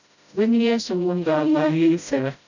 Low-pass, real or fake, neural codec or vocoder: 7.2 kHz; fake; codec, 16 kHz, 0.5 kbps, FreqCodec, smaller model